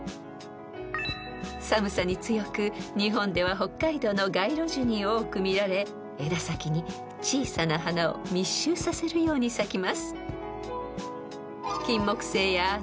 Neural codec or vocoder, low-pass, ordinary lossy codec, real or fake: none; none; none; real